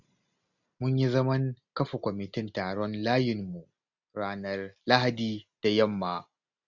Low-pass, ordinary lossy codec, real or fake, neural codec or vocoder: 7.2 kHz; none; real; none